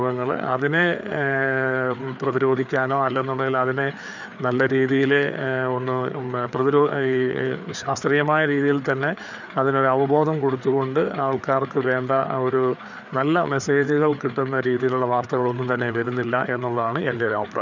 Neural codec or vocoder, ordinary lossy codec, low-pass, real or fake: codec, 16 kHz, 4 kbps, FreqCodec, larger model; none; 7.2 kHz; fake